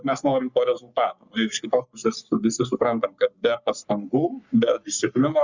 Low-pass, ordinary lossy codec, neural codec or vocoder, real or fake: 7.2 kHz; Opus, 64 kbps; codec, 44.1 kHz, 3.4 kbps, Pupu-Codec; fake